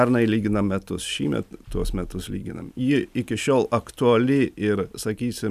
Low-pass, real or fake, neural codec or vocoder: 14.4 kHz; real; none